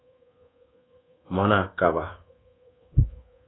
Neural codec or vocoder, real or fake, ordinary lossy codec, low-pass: codec, 24 kHz, 1.2 kbps, DualCodec; fake; AAC, 16 kbps; 7.2 kHz